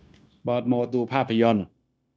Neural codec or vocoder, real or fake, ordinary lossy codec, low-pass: codec, 16 kHz, 0.9 kbps, LongCat-Audio-Codec; fake; none; none